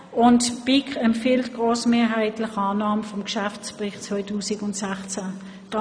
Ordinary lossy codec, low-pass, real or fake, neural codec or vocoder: none; 9.9 kHz; real; none